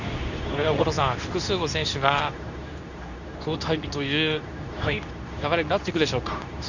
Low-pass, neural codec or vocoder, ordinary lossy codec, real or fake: 7.2 kHz; codec, 24 kHz, 0.9 kbps, WavTokenizer, medium speech release version 2; none; fake